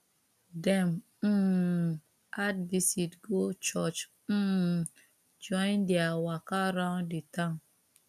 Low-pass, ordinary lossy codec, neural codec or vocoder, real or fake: 14.4 kHz; none; none; real